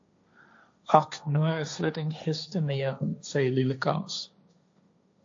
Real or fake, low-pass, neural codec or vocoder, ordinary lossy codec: fake; 7.2 kHz; codec, 16 kHz, 1.1 kbps, Voila-Tokenizer; AAC, 48 kbps